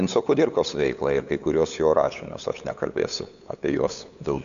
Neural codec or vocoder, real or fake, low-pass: codec, 16 kHz, 8 kbps, FunCodec, trained on LibriTTS, 25 frames a second; fake; 7.2 kHz